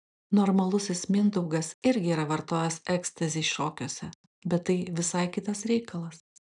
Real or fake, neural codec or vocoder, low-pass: real; none; 10.8 kHz